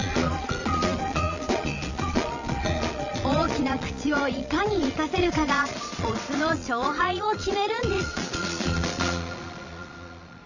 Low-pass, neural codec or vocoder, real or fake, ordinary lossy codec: 7.2 kHz; vocoder, 22.05 kHz, 80 mel bands, Vocos; fake; none